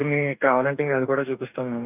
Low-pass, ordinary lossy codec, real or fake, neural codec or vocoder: 3.6 kHz; none; fake; codec, 44.1 kHz, 2.6 kbps, DAC